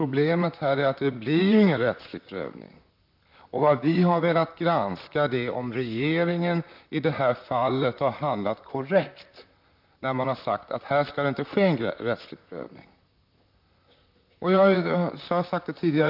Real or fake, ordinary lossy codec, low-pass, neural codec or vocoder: fake; MP3, 48 kbps; 5.4 kHz; vocoder, 44.1 kHz, 128 mel bands, Pupu-Vocoder